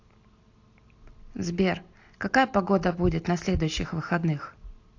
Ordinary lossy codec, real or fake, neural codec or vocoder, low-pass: AAC, 48 kbps; real; none; 7.2 kHz